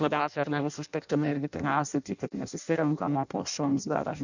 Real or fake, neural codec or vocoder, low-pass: fake; codec, 16 kHz in and 24 kHz out, 0.6 kbps, FireRedTTS-2 codec; 7.2 kHz